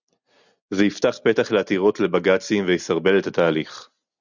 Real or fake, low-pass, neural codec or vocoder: real; 7.2 kHz; none